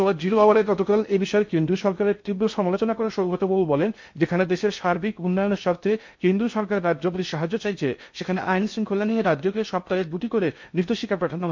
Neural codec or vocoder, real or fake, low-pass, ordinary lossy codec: codec, 16 kHz in and 24 kHz out, 0.6 kbps, FocalCodec, streaming, 2048 codes; fake; 7.2 kHz; MP3, 48 kbps